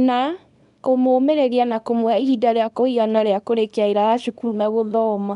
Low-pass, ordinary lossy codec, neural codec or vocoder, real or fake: 10.8 kHz; none; codec, 24 kHz, 0.9 kbps, WavTokenizer, small release; fake